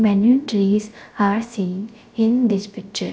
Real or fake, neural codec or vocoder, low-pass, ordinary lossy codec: fake; codec, 16 kHz, 0.3 kbps, FocalCodec; none; none